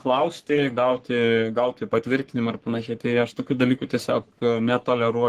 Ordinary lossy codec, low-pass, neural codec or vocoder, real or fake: Opus, 16 kbps; 14.4 kHz; codec, 44.1 kHz, 3.4 kbps, Pupu-Codec; fake